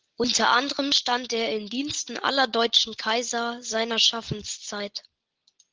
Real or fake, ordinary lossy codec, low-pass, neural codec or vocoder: real; Opus, 16 kbps; 7.2 kHz; none